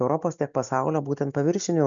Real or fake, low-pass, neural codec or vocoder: real; 7.2 kHz; none